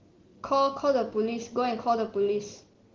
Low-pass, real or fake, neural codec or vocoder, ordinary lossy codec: 7.2 kHz; real; none; Opus, 16 kbps